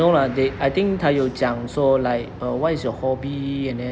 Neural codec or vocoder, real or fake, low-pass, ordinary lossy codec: none; real; none; none